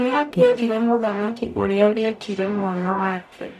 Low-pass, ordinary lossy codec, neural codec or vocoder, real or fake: 14.4 kHz; none; codec, 44.1 kHz, 0.9 kbps, DAC; fake